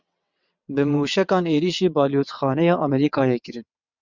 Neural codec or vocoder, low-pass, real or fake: vocoder, 22.05 kHz, 80 mel bands, WaveNeXt; 7.2 kHz; fake